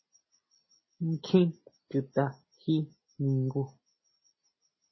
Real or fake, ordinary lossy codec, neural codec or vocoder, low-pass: real; MP3, 24 kbps; none; 7.2 kHz